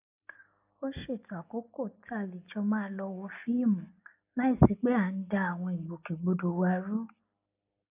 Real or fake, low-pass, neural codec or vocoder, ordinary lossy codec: real; 3.6 kHz; none; AAC, 32 kbps